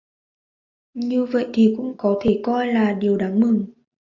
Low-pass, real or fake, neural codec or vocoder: 7.2 kHz; real; none